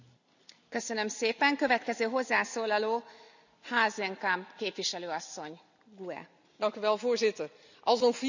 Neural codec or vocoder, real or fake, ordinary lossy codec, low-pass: none; real; none; 7.2 kHz